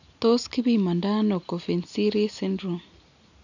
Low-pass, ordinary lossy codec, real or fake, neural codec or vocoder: 7.2 kHz; none; real; none